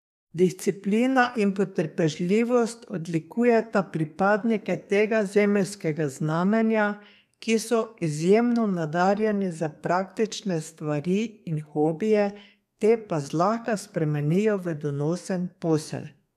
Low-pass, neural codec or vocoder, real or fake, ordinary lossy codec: 14.4 kHz; codec, 32 kHz, 1.9 kbps, SNAC; fake; none